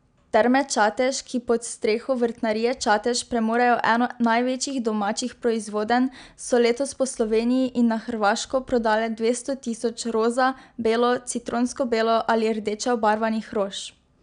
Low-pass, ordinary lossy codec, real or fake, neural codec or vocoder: 9.9 kHz; none; real; none